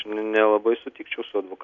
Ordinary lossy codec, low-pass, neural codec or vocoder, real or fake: MP3, 64 kbps; 7.2 kHz; none; real